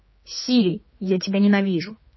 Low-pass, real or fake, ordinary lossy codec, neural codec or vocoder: 7.2 kHz; fake; MP3, 24 kbps; codec, 16 kHz, 4 kbps, X-Codec, HuBERT features, trained on general audio